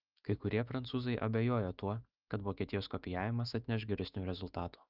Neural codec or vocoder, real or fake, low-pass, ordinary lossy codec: autoencoder, 48 kHz, 128 numbers a frame, DAC-VAE, trained on Japanese speech; fake; 5.4 kHz; Opus, 24 kbps